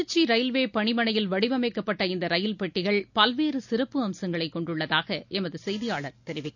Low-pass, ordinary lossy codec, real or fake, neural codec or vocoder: 7.2 kHz; none; real; none